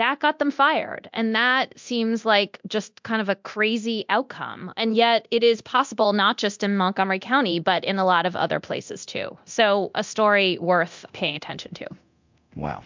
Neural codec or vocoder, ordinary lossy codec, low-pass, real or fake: codec, 24 kHz, 0.9 kbps, DualCodec; MP3, 64 kbps; 7.2 kHz; fake